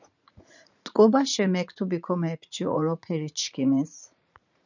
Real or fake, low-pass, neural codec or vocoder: fake; 7.2 kHz; vocoder, 44.1 kHz, 80 mel bands, Vocos